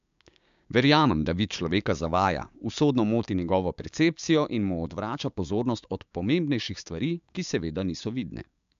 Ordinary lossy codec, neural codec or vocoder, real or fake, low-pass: MP3, 64 kbps; codec, 16 kHz, 6 kbps, DAC; fake; 7.2 kHz